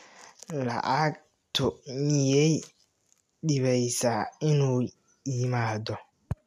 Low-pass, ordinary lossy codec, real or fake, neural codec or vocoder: 14.4 kHz; none; real; none